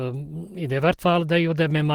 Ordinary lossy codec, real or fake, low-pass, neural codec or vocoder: Opus, 16 kbps; real; 19.8 kHz; none